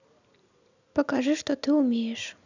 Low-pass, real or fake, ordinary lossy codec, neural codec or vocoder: 7.2 kHz; real; none; none